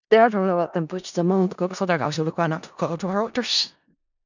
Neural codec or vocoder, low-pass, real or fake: codec, 16 kHz in and 24 kHz out, 0.4 kbps, LongCat-Audio-Codec, four codebook decoder; 7.2 kHz; fake